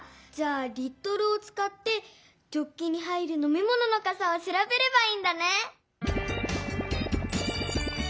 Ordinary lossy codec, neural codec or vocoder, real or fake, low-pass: none; none; real; none